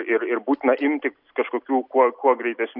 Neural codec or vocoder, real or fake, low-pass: none; real; 5.4 kHz